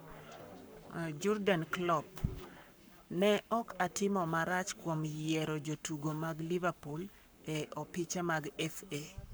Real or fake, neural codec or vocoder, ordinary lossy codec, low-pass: fake; codec, 44.1 kHz, 7.8 kbps, Pupu-Codec; none; none